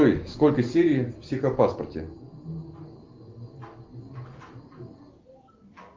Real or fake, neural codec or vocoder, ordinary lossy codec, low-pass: real; none; Opus, 32 kbps; 7.2 kHz